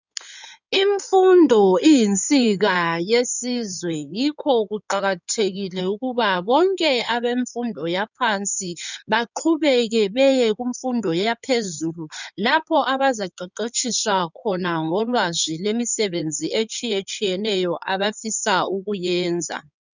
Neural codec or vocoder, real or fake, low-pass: codec, 16 kHz in and 24 kHz out, 2.2 kbps, FireRedTTS-2 codec; fake; 7.2 kHz